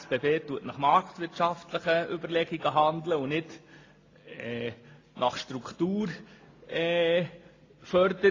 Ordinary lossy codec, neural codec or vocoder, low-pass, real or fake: AAC, 32 kbps; none; 7.2 kHz; real